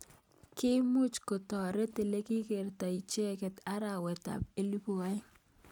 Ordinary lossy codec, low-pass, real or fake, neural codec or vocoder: none; 19.8 kHz; real; none